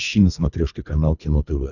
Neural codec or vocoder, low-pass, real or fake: codec, 24 kHz, 3 kbps, HILCodec; 7.2 kHz; fake